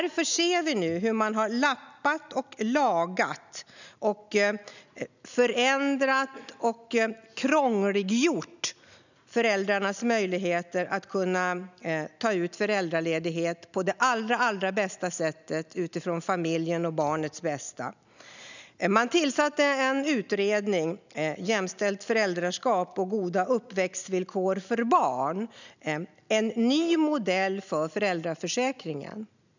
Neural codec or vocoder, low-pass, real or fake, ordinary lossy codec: none; 7.2 kHz; real; none